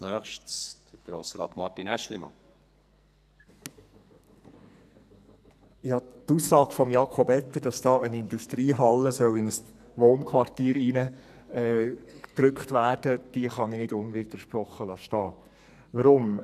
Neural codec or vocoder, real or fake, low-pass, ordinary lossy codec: codec, 44.1 kHz, 2.6 kbps, SNAC; fake; 14.4 kHz; none